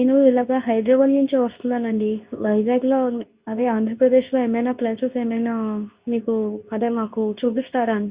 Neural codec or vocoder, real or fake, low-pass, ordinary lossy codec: codec, 24 kHz, 0.9 kbps, WavTokenizer, medium speech release version 2; fake; 3.6 kHz; Opus, 64 kbps